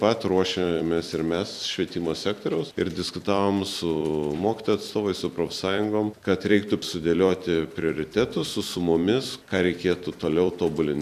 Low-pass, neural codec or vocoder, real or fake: 14.4 kHz; vocoder, 44.1 kHz, 128 mel bands every 256 samples, BigVGAN v2; fake